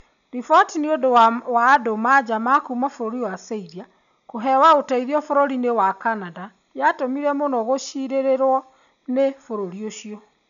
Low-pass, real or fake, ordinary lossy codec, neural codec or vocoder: 7.2 kHz; real; none; none